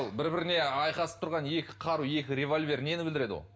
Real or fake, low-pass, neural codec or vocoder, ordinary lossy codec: real; none; none; none